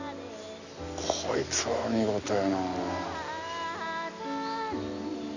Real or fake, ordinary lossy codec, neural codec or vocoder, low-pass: real; none; none; 7.2 kHz